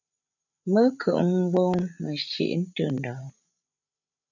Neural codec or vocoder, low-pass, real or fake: codec, 16 kHz, 16 kbps, FreqCodec, larger model; 7.2 kHz; fake